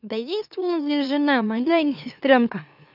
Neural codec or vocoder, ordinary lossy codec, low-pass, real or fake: autoencoder, 44.1 kHz, a latent of 192 numbers a frame, MeloTTS; none; 5.4 kHz; fake